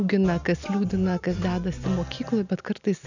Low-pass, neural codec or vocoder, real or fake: 7.2 kHz; none; real